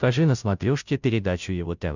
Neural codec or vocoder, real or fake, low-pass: codec, 16 kHz, 0.5 kbps, FunCodec, trained on Chinese and English, 25 frames a second; fake; 7.2 kHz